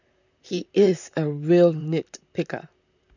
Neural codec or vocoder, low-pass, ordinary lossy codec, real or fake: vocoder, 44.1 kHz, 128 mel bands, Pupu-Vocoder; 7.2 kHz; none; fake